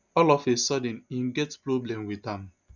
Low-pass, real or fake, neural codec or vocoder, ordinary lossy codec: 7.2 kHz; real; none; none